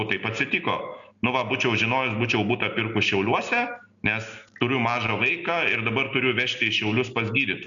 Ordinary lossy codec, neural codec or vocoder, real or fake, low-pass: MP3, 96 kbps; none; real; 7.2 kHz